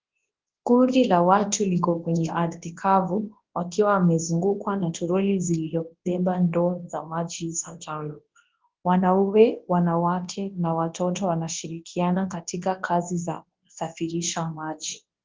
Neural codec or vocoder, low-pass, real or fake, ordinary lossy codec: codec, 24 kHz, 0.9 kbps, WavTokenizer, large speech release; 7.2 kHz; fake; Opus, 16 kbps